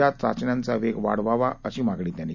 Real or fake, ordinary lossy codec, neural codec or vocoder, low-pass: real; none; none; 7.2 kHz